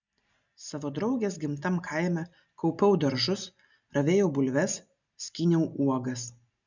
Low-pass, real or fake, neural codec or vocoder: 7.2 kHz; real; none